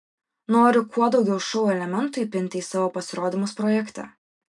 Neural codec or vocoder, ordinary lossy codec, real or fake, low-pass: none; MP3, 96 kbps; real; 10.8 kHz